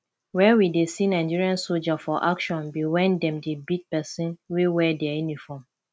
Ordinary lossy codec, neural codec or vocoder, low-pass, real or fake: none; none; none; real